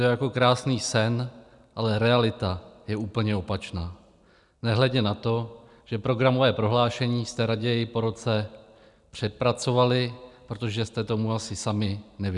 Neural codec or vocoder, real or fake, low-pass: none; real; 10.8 kHz